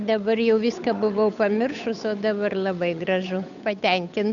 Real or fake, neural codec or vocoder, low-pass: fake; codec, 16 kHz, 8 kbps, FunCodec, trained on Chinese and English, 25 frames a second; 7.2 kHz